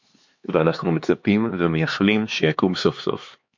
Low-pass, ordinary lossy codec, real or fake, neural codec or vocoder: 7.2 kHz; MP3, 48 kbps; fake; codec, 16 kHz, 4 kbps, X-Codec, HuBERT features, trained on LibriSpeech